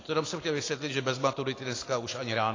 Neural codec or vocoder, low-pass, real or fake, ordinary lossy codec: none; 7.2 kHz; real; AAC, 32 kbps